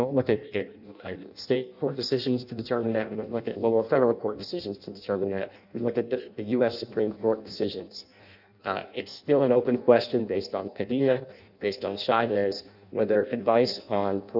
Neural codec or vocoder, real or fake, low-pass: codec, 16 kHz in and 24 kHz out, 0.6 kbps, FireRedTTS-2 codec; fake; 5.4 kHz